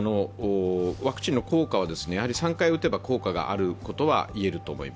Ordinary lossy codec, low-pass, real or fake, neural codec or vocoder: none; none; real; none